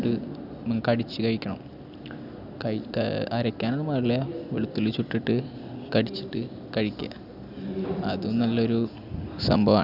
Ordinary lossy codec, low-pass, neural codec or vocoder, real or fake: none; 5.4 kHz; none; real